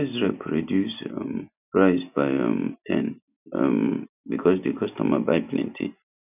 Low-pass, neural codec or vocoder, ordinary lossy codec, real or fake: 3.6 kHz; none; none; real